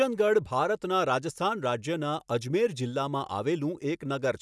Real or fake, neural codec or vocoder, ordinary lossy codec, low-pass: real; none; none; none